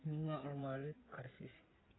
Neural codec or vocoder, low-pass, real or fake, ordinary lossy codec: codec, 16 kHz in and 24 kHz out, 2.2 kbps, FireRedTTS-2 codec; 7.2 kHz; fake; AAC, 16 kbps